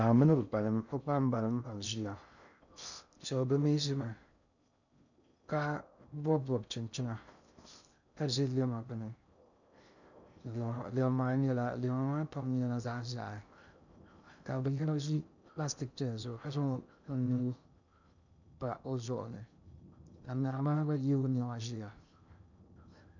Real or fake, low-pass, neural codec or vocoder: fake; 7.2 kHz; codec, 16 kHz in and 24 kHz out, 0.6 kbps, FocalCodec, streaming, 2048 codes